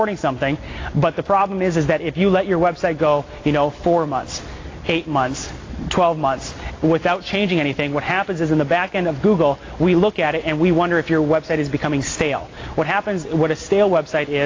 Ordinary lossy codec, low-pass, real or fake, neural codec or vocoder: AAC, 32 kbps; 7.2 kHz; real; none